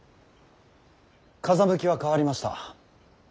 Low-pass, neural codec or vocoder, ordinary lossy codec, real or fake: none; none; none; real